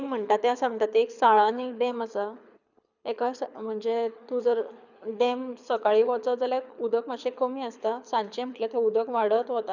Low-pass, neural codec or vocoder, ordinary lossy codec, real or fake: 7.2 kHz; codec, 24 kHz, 6 kbps, HILCodec; none; fake